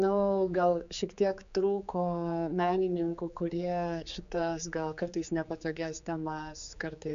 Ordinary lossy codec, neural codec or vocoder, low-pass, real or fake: MP3, 64 kbps; codec, 16 kHz, 4 kbps, X-Codec, HuBERT features, trained on general audio; 7.2 kHz; fake